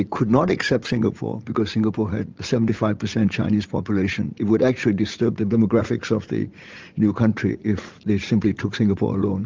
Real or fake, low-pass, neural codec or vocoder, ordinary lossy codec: fake; 7.2 kHz; vocoder, 44.1 kHz, 80 mel bands, Vocos; Opus, 24 kbps